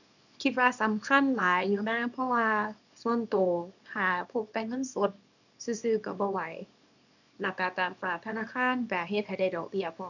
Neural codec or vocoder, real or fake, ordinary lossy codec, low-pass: codec, 24 kHz, 0.9 kbps, WavTokenizer, small release; fake; none; 7.2 kHz